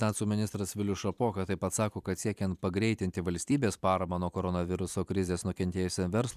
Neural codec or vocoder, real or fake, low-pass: vocoder, 44.1 kHz, 128 mel bands every 256 samples, BigVGAN v2; fake; 14.4 kHz